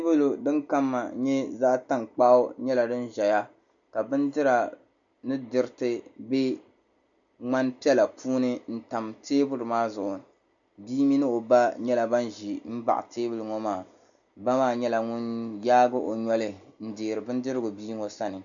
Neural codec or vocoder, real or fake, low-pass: none; real; 7.2 kHz